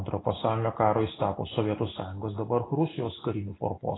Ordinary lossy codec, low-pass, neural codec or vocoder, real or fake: AAC, 16 kbps; 7.2 kHz; none; real